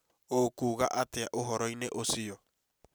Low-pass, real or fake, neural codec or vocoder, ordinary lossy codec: none; real; none; none